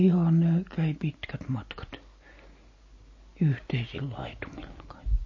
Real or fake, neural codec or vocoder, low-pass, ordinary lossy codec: real; none; 7.2 kHz; MP3, 32 kbps